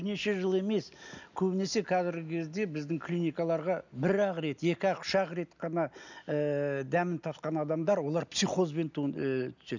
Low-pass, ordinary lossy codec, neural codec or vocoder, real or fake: 7.2 kHz; none; none; real